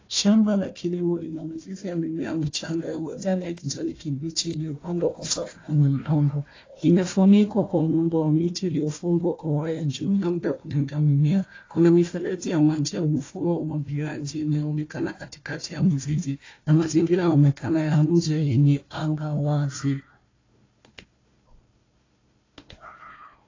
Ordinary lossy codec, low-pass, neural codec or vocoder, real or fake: AAC, 32 kbps; 7.2 kHz; codec, 16 kHz, 1 kbps, FunCodec, trained on Chinese and English, 50 frames a second; fake